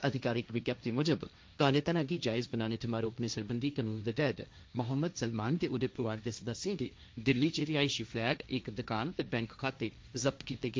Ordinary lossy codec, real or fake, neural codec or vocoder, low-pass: none; fake; codec, 16 kHz, 1.1 kbps, Voila-Tokenizer; none